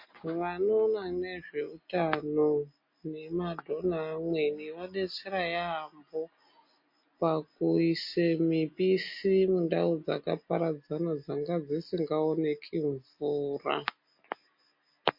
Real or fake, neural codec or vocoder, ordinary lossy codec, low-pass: real; none; MP3, 32 kbps; 5.4 kHz